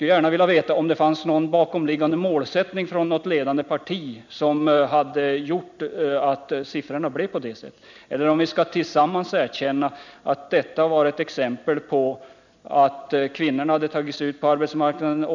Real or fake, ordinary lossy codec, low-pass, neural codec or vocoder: real; none; 7.2 kHz; none